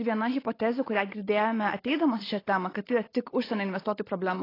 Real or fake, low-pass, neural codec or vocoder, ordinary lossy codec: real; 5.4 kHz; none; AAC, 24 kbps